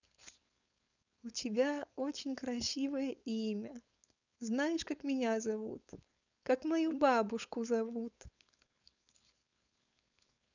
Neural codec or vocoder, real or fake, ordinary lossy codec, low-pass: codec, 16 kHz, 4.8 kbps, FACodec; fake; none; 7.2 kHz